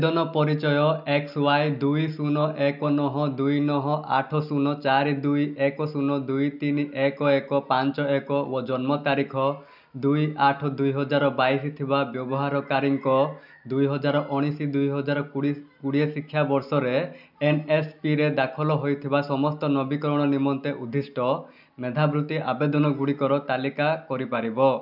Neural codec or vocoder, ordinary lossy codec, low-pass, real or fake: none; none; 5.4 kHz; real